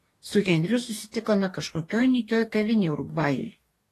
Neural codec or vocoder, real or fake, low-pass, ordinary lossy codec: codec, 44.1 kHz, 2.6 kbps, DAC; fake; 14.4 kHz; AAC, 48 kbps